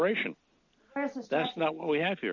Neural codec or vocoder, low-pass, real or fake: none; 7.2 kHz; real